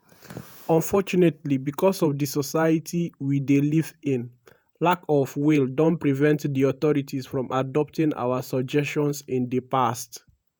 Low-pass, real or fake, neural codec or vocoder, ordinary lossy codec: none; fake; vocoder, 48 kHz, 128 mel bands, Vocos; none